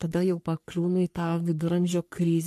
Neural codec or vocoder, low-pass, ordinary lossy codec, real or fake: codec, 44.1 kHz, 3.4 kbps, Pupu-Codec; 14.4 kHz; MP3, 64 kbps; fake